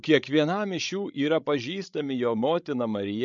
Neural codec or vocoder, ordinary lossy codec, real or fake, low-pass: codec, 16 kHz, 16 kbps, FreqCodec, larger model; MP3, 64 kbps; fake; 7.2 kHz